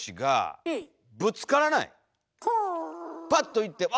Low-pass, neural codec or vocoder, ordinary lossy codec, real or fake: none; none; none; real